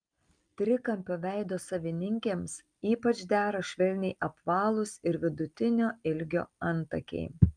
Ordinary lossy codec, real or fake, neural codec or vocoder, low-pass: Opus, 32 kbps; real; none; 9.9 kHz